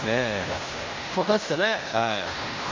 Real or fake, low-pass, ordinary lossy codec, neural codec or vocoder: fake; 7.2 kHz; MP3, 48 kbps; codec, 16 kHz in and 24 kHz out, 0.9 kbps, LongCat-Audio-Codec, fine tuned four codebook decoder